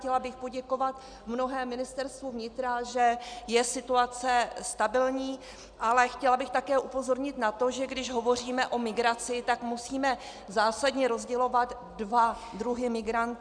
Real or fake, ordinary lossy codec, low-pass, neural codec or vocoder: real; Opus, 64 kbps; 9.9 kHz; none